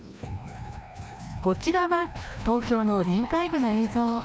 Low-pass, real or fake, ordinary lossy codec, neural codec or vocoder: none; fake; none; codec, 16 kHz, 1 kbps, FreqCodec, larger model